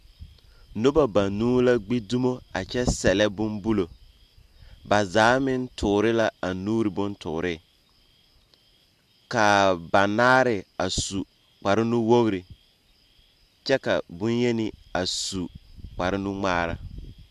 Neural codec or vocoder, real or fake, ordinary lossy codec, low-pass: none; real; AAC, 96 kbps; 14.4 kHz